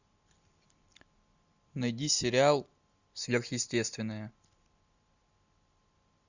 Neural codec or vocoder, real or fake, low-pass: none; real; 7.2 kHz